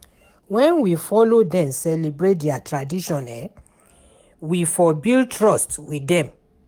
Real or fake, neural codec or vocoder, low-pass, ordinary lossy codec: fake; codec, 44.1 kHz, 7.8 kbps, DAC; 19.8 kHz; Opus, 32 kbps